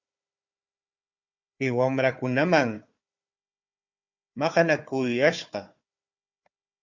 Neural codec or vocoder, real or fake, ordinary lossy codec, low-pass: codec, 16 kHz, 4 kbps, FunCodec, trained on Chinese and English, 50 frames a second; fake; Opus, 64 kbps; 7.2 kHz